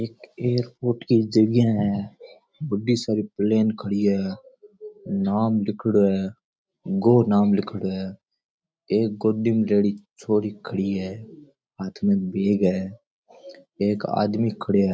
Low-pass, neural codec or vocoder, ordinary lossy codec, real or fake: none; none; none; real